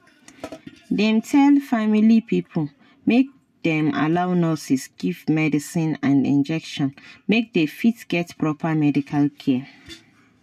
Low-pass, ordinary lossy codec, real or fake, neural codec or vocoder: 14.4 kHz; none; real; none